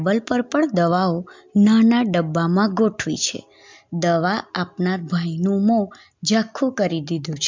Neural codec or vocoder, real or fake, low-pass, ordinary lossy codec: none; real; 7.2 kHz; MP3, 64 kbps